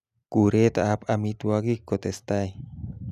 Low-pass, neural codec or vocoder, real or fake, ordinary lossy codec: 14.4 kHz; none; real; none